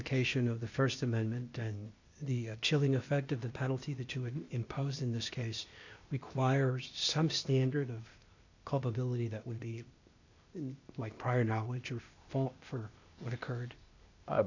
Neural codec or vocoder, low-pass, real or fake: codec, 16 kHz, 0.8 kbps, ZipCodec; 7.2 kHz; fake